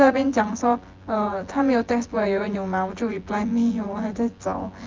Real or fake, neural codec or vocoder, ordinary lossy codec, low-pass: fake; vocoder, 24 kHz, 100 mel bands, Vocos; Opus, 32 kbps; 7.2 kHz